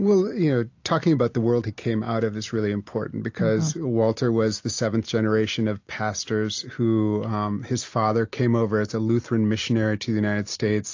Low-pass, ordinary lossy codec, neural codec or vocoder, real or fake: 7.2 kHz; AAC, 48 kbps; none; real